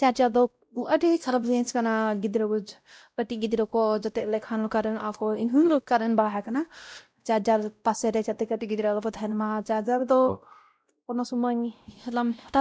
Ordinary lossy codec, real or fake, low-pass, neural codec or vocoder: none; fake; none; codec, 16 kHz, 0.5 kbps, X-Codec, WavLM features, trained on Multilingual LibriSpeech